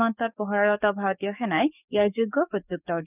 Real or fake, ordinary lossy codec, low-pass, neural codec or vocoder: fake; none; 3.6 kHz; codec, 44.1 kHz, 7.8 kbps, DAC